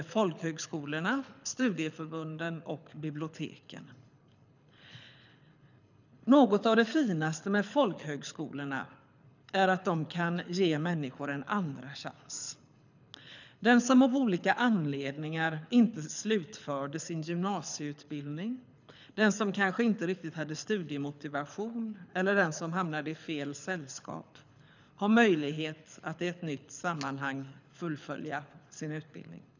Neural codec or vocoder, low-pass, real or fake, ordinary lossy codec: codec, 24 kHz, 6 kbps, HILCodec; 7.2 kHz; fake; none